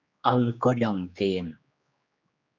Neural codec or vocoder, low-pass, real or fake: codec, 16 kHz, 2 kbps, X-Codec, HuBERT features, trained on general audio; 7.2 kHz; fake